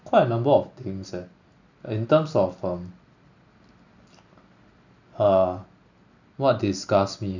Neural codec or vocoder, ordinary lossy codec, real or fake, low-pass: none; none; real; 7.2 kHz